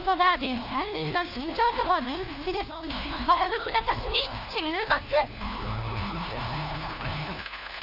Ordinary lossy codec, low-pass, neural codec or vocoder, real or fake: AAC, 48 kbps; 5.4 kHz; codec, 16 kHz, 1 kbps, FunCodec, trained on LibriTTS, 50 frames a second; fake